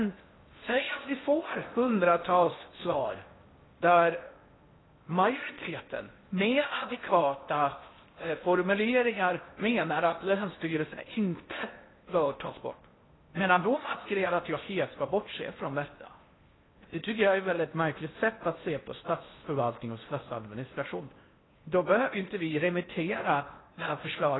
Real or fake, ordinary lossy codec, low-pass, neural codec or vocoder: fake; AAC, 16 kbps; 7.2 kHz; codec, 16 kHz in and 24 kHz out, 0.6 kbps, FocalCodec, streaming, 4096 codes